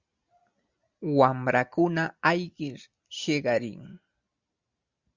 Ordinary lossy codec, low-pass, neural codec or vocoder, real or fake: Opus, 64 kbps; 7.2 kHz; none; real